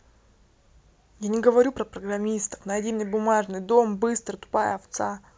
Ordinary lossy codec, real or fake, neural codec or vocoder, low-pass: none; real; none; none